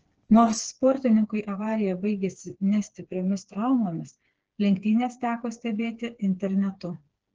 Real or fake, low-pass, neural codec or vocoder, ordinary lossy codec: fake; 7.2 kHz; codec, 16 kHz, 4 kbps, FreqCodec, smaller model; Opus, 16 kbps